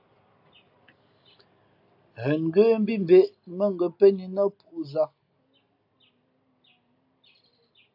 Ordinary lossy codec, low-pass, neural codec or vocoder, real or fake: AAC, 48 kbps; 5.4 kHz; none; real